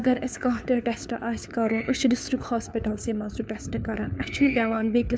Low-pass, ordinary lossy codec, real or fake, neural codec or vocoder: none; none; fake; codec, 16 kHz, 4 kbps, FunCodec, trained on LibriTTS, 50 frames a second